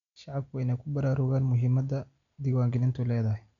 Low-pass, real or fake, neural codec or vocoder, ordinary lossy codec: 7.2 kHz; real; none; none